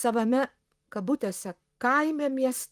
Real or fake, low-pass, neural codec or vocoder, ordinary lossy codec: fake; 14.4 kHz; vocoder, 44.1 kHz, 128 mel bands, Pupu-Vocoder; Opus, 32 kbps